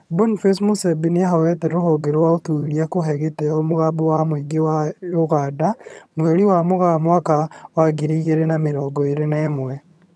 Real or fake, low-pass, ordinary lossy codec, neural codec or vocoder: fake; none; none; vocoder, 22.05 kHz, 80 mel bands, HiFi-GAN